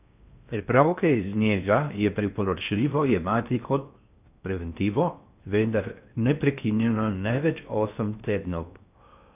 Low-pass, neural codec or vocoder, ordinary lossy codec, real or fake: 3.6 kHz; codec, 16 kHz in and 24 kHz out, 0.6 kbps, FocalCodec, streaming, 4096 codes; none; fake